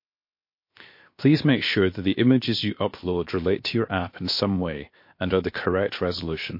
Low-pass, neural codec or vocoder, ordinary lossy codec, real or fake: 5.4 kHz; codec, 16 kHz, 0.7 kbps, FocalCodec; MP3, 32 kbps; fake